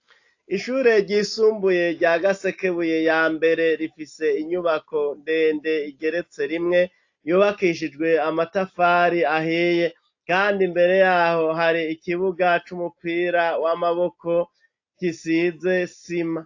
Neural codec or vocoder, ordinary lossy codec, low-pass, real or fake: none; AAC, 48 kbps; 7.2 kHz; real